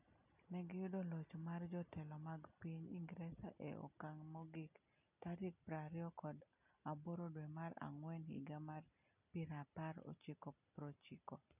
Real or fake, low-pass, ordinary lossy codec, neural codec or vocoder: real; 3.6 kHz; none; none